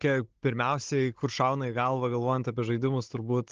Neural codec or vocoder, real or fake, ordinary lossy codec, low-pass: codec, 16 kHz, 16 kbps, FunCodec, trained on Chinese and English, 50 frames a second; fake; Opus, 16 kbps; 7.2 kHz